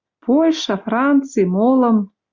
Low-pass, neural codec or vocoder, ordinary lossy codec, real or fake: 7.2 kHz; none; Opus, 64 kbps; real